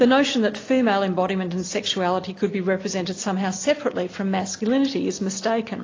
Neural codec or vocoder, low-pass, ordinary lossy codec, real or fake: none; 7.2 kHz; AAC, 32 kbps; real